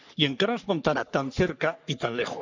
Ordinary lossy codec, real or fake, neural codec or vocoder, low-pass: none; fake; codec, 44.1 kHz, 3.4 kbps, Pupu-Codec; 7.2 kHz